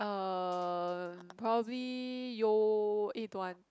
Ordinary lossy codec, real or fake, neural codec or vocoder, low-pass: none; real; none; none